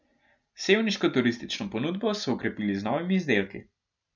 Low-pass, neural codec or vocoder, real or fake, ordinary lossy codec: 7.2 kHz; none; real; none